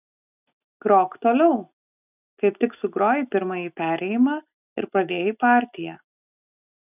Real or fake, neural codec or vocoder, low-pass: real; none; 3.6 kHz